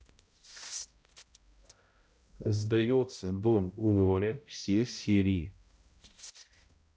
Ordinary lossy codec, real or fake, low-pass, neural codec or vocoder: none; fake; none; codec, 16 kHz, 0.5 kbps, X-Codec, HuBERT features, trained on balanced general audio